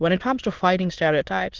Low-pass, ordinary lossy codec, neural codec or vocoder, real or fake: 7.2 kHz; Opus, 16 kbps; autoencoder, 22.05 kHz, a latent of 192 numbers a frame, VITS, trained on many speakers; fake